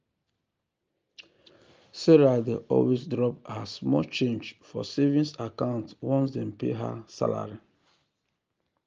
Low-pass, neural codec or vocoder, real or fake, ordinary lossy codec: 7.2 kHz; none; real; Opus, 24 kbps